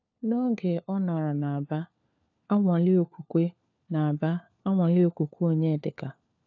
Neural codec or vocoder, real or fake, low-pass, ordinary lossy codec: codec, 16 kHz, 4 kbps, FunCodec, trained on LibriTTS, 50 frames a second; fake; 7.2 kHz; AAC, 48 kbps